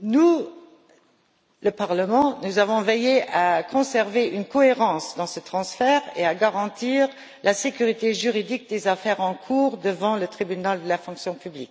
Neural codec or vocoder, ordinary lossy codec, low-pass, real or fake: none; none; none; real